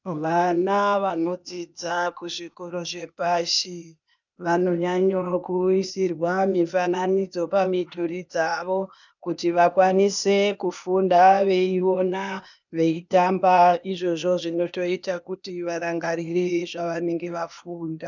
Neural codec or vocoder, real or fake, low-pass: codec, 16 kHz, 0.8 kbps, ZipCodec; fake; 7.2 kHz